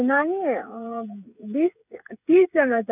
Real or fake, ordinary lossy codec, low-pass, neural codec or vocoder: fake; none; 3.6 kHz; codec, 16 kHz, 8 kbps, FreqCodec, smaller model